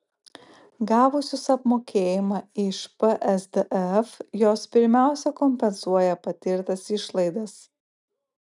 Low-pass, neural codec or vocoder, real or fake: 10.8 kHz; none; real